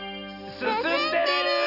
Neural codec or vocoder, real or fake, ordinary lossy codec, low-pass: none; real; none; 5.4 kHz